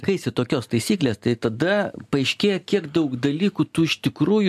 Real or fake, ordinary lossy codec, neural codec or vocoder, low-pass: real; AAC, 96 kbps; none; 14.4 kHz